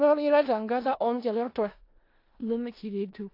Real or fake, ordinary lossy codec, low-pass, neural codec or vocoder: fake; AAC, 32 kbps; 5.4 kHz; codec, 16 kHz in and 24 kHz out, 0.4 kbps, LongCat-Audio-Codec, four codebook decoder